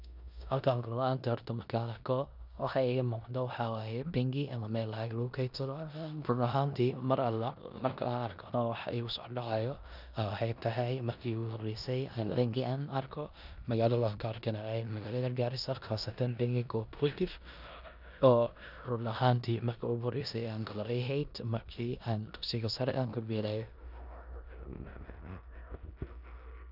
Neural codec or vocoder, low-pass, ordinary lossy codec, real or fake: codec, 16 kHz in and 24 kHz out, 0.9 kbps, LongCat-Audio-Codec, four codebook decoder; 5.4 kHz; none; fake